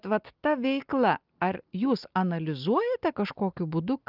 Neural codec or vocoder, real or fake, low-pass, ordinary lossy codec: none; real; 5.4 kHz; Opus, 24 kbps